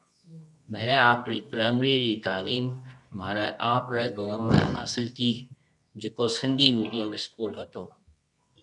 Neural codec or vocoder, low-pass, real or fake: codec, 24 kHz, 0.9 kbps, WavTokenizer, medium music audio release; 10.8 kHz; fake